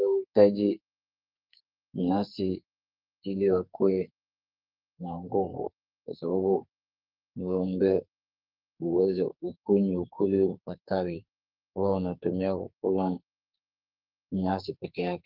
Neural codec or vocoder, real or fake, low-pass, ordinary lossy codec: codec, 44.1 kHz, 2.6 kbps, SNAC; fake; 5.4 kHz; Opus, 32 kbps